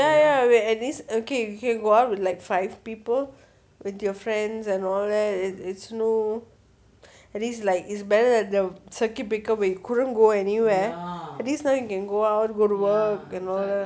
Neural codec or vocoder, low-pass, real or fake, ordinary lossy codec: none; none; real; none